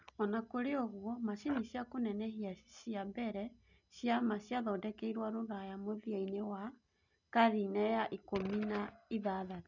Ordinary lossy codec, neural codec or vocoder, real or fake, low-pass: none; none; real; 7.2 kHz